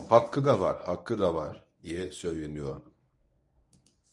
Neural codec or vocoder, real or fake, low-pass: codec, 24 kHz, 0.9 kbps, WavTokenizer, medium speech release version 1; fake; 10.8 kHz